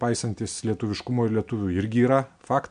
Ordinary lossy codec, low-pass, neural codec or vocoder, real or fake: MP3, 64 kbps; 9.9 kHz; none; real